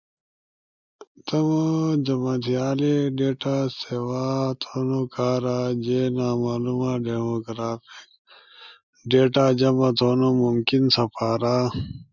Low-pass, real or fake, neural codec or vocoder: 7.2 kHz; real; none